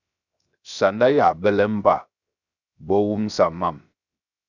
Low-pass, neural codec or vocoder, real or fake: 7.2 kHz; codec, 16 kHz, 0.7 kbps, FocalCodec; fake